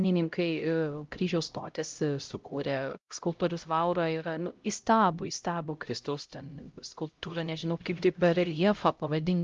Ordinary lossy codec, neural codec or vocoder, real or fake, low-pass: Opus, 32 kbps; codec, 16 kHz, 0.5 kbps, X-Codec, HuBERT features, trained on LibriSpeech; fake; 7.2 kHz